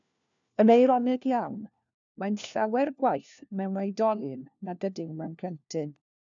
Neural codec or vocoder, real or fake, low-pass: codec, 16 kHz, 1 kbps, FunCodec, trained on LibriTTS, 50 frames a second; fake; 7.2 kHz